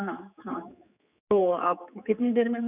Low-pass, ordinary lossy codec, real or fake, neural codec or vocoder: 3.6 kHz; none; fake; codec, 16 kHz, 4 kbps, X-Codec, HuBERT features, trained on general audio